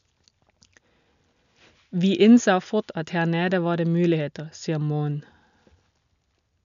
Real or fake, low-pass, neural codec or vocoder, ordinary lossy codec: real; 7.2 kHz; none; none